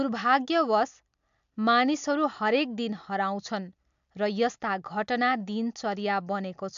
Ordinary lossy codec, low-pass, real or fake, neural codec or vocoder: AAC, 64 kbps; 7.2 kHz; real; none